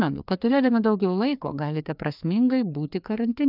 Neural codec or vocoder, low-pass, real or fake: codec, 16 kHz, 2 kbps, FreqCodec, larger model; 5.4 kHz; fake